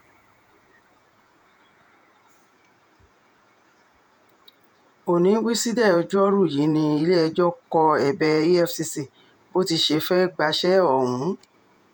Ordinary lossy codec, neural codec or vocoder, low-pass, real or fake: none; vocoder, 48 kHz, 128 mel bands, Vocos; none; fake